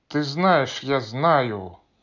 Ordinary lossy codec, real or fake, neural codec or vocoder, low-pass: none; real; none; 7.2 kHz